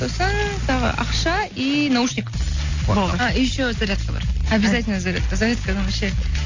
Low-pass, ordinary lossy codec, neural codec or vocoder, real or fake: 7.2 kHz; AAC, 48 kbps; none; real